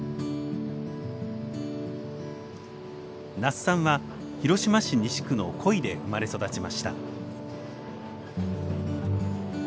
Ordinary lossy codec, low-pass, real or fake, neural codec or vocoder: none; none; real; none